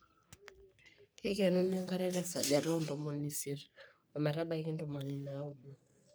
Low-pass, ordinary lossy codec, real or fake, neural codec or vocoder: none; none; fake; codec, 44.1 kHz, 3.4 kbps, Pupu-Codec